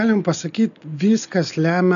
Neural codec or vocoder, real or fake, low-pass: none; real; 7.2 kHz